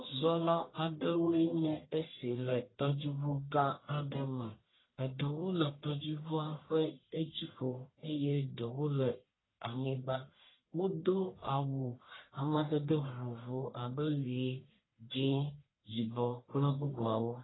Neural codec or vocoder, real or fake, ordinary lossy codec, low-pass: codec, 44.1 kHz, 1.7 kbps, Pupu-Codec; fake; AAC, 16 kbps; 7.2 kHz